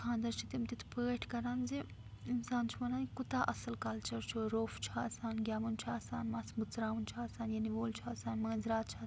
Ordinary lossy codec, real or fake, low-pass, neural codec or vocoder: none; real; none; none